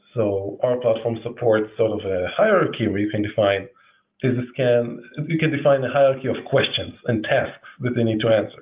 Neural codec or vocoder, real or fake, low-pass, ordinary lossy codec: none; real; 3.6 kHz; Opus, 24 kbps